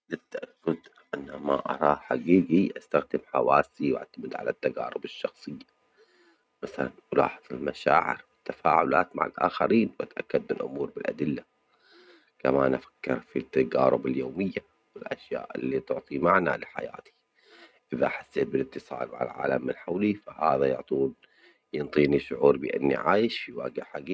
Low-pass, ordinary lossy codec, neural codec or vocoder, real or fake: none; none; none; real